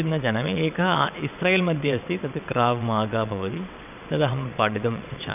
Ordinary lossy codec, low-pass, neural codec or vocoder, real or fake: none; 3.6 kHz; vocoder, 22.05 kHz, 80 mel bands, WaveNeXt; fake